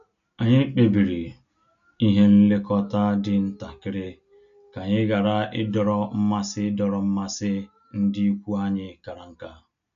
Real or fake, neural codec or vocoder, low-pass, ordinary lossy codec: real; none; 7.2 kHz; none